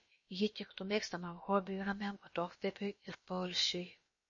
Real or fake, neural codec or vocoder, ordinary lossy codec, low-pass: fake; codec, 16 kHz, about 1 kbps, DyCAST, with the encoder's durations; MP3, 32 kbps; 7.2 kHz